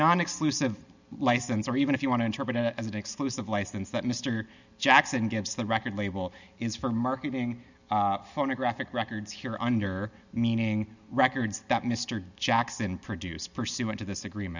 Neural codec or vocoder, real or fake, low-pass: none; real; 7.2 kHz